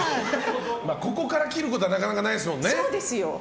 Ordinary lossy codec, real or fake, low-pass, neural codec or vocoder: none; real; none; none